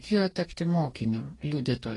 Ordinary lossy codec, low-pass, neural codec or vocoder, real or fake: AAC, 32 kbps; 10.8 kHz; codec, 44.1 kHz, 2.6 kbps, DAC; fake